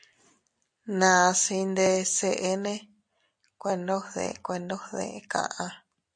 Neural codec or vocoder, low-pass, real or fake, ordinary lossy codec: none; 9.9 kHz; real; MP3, 48 kbps